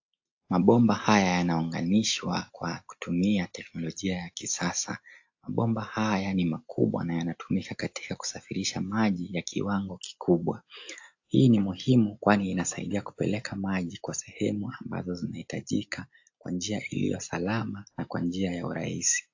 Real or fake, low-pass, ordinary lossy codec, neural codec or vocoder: real; 7.2 kHz; AAC, 48 kbps; none